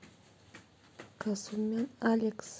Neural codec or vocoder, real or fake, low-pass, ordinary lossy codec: none; real; none; none